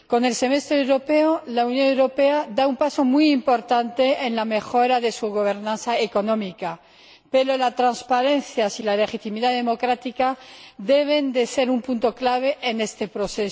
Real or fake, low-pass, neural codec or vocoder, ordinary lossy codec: real; none; none; none